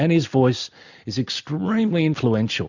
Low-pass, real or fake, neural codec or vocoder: 7.2 kHz; real; none